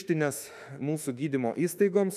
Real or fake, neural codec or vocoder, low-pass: fake; autoencoder, 48 kHz, 32 numbers a frame, DAC-VAE, trained on Japanese speech; 14.4 kHz